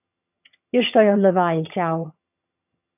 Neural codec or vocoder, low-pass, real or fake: vocoder, 22.05 kHz, 80 mel bands, HiFi-GAN; 3.6 kHz; fake